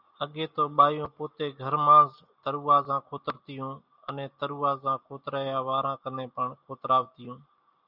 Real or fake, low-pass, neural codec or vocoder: real; 5.4 kHz; none